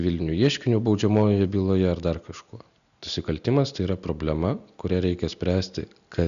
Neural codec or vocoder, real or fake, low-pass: none; real; 7.2 kHz